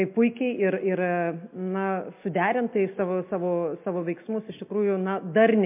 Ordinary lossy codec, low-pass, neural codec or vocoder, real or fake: MP3, 32 kbps; 3.6 kHz; none; real